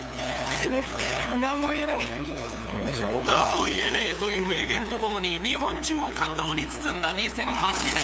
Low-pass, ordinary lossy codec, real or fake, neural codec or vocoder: none; none; fake; codec, 16 kHz, 2 kbps, FunCodec, trained on LibriTTS, 25 frames a second